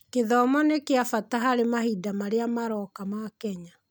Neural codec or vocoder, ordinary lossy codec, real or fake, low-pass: none; none; real; none